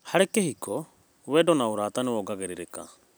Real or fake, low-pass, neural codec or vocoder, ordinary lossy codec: real; none; none; none